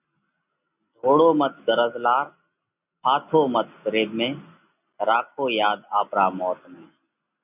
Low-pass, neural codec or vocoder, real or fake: 3.6 kHz; none; real